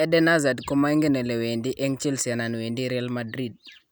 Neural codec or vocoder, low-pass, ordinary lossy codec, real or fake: none; none; none; real